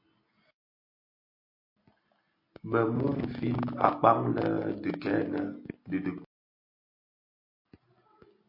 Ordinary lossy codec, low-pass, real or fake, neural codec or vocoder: MP3, 32 kbps; 5.4 kHz; real; none